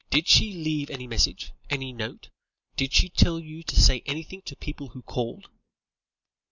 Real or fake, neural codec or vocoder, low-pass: real; none; 7.2 kHz